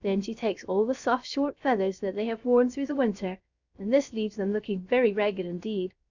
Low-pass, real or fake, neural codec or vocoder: 7.2 kHz; fake; codec, 16 kHz, 0.7 kbps, FocalCodec